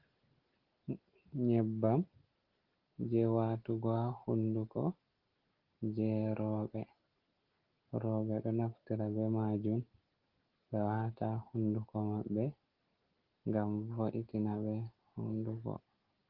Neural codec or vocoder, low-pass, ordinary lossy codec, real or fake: none; 5.4 kHz; Opus, 16 kbps; real